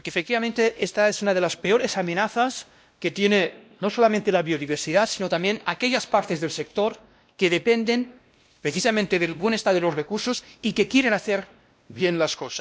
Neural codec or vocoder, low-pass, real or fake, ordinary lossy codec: codec, 16 kHz, 1 kbps, X-Codec, WavLM features, trained on Multilingual LibriSpeech; none; fake; none